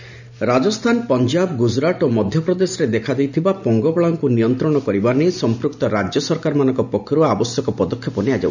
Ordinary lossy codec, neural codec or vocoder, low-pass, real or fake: none; none; 7.2 kHz; real